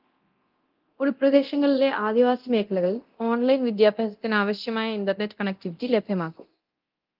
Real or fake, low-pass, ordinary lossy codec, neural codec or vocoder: fake; 5.4 kHz; Opus, 24 kbps; codec, 24 kHz, 0.9 kbps, DualCodec